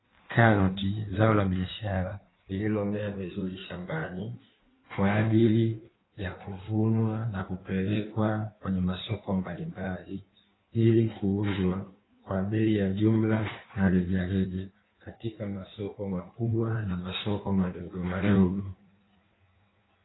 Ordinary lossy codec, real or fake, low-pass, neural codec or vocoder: AAC, 16 kbps; fake; 7.2 kHz; codec, 16 kHz in and 24 kHz out, 1.1 kbps, FireRedTTS-2 codec